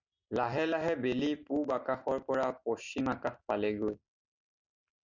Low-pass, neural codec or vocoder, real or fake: 7.2 kHz; none; real